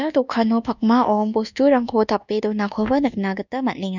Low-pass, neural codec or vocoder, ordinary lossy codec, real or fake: 7.2 kHz; codec, 24 kHz, 1.2 kbps, DualCodec; none; fake